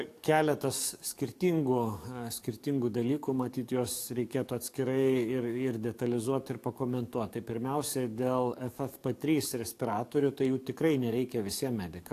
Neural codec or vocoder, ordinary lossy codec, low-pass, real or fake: codec, 44.1 kHz, 7.8 kbps, DAC; AAC, 64 kbps; 14.4 kHz; fake